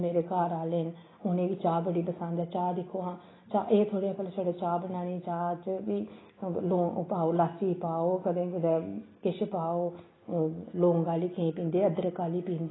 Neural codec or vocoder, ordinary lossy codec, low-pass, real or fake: none; AAC, 16 kbps; 7.2 kHz; real